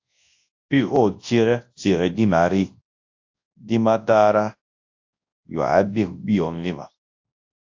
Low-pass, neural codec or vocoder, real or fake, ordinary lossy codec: 7.2 kHz; codec, 24 kHz, 0.9 kbps, WavTokenizer, large speech release; fake; AAC, 48 kbps